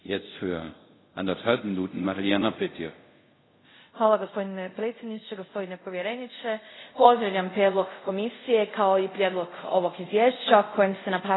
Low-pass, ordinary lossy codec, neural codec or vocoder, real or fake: 7.2 kHz; AAC, 16 kbps; codec, 24 kHz, 0.5 kbps, DualCodec; fake